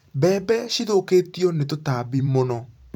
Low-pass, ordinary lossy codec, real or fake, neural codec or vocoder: 19.8 kHz; none; fake; vocoder, 44.1 kHz, 128 mel bands every 512 samples, BigVGAN v2